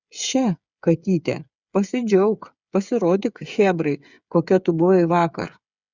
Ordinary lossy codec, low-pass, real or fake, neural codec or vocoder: Opus, 64 kbps; 7.2 kHz; fake; codec, 16 kHz, 8 kbps, FreqCodec, smaller model